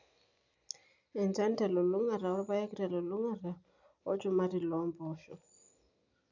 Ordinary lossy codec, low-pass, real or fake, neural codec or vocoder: none; 7.2 kHz; real; none